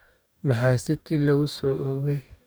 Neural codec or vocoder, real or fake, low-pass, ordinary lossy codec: codec, 44.1 kHz, 2.6 kbps, DAC; fake; none; none